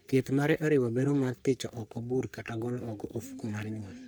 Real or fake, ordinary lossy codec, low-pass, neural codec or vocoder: fake; none; none; codec, 44.1 kHz, 3.4 kbps, Pupu-Codec